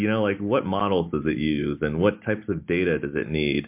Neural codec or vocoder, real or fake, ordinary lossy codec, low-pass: none; real; MP3, 32 kbps; 3.6 kHz